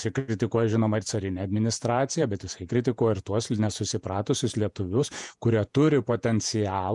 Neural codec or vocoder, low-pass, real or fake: vocoder, 48 kHz, 128 mel bands, Vocos; 10.8 kHz; fake